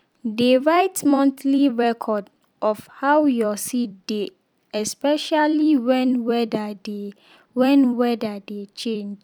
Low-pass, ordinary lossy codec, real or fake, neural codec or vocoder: 19.8 kHz; none; fake; vocoder, 44.1 kHz, 128 mel bands every 256 samples, BigVGAN v2